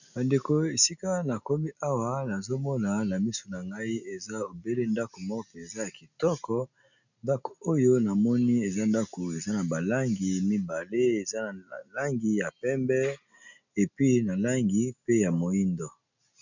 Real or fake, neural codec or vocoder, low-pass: real; none; 7.2 kHz